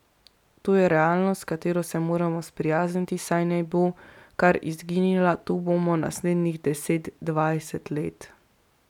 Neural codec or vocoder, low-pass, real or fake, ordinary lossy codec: none; 19.8 kHz; real; none